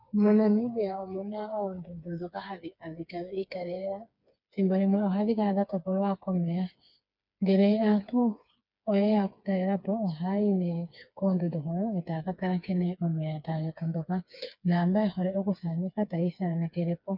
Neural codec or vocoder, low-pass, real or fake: codec, 16 kHz, 4 kbps, FreqCodec, smaller model; 5.4 kHz; fake